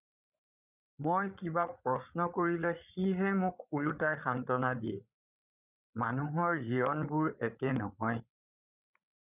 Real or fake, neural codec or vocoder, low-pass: fake; codec, 16 kHz, 8 kbps, FreqCodec, larger model; 3.6 kHz